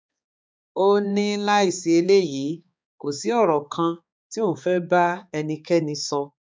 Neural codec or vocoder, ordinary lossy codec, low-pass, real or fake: codec, 16 kHz, 4 kbps, X-Codec, HuBERT features, trained on balanced general audio; none; none; fake